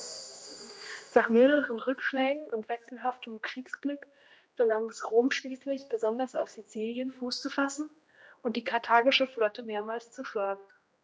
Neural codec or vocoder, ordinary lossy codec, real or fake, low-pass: codec, 16 kHz, 1 kbps, X-Codec, HuBERT features, trained on general audio; none; fake; none